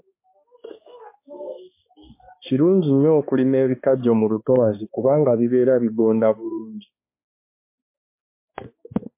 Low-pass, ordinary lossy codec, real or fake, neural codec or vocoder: 3.6 kHz; MP3, 24 kbps; fake; codec, 16 kHz, 2 kbps, X-Codec, HuBERT features, trained on balanced general audio